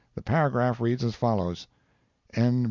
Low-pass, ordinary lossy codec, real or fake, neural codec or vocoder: 7.2 kHz; Opus, 64 kbps; real; none